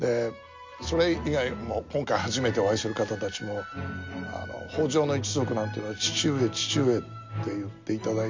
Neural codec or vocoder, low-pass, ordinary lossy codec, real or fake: none; 7.2 kHz; MP3, 48 kbps; real